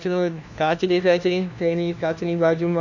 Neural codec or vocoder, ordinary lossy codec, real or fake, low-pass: codec, 16 kHz, 1 kbps, FunCodec, trained on LibriTTS, 50 frames a second; none; fake; 7.2 kHz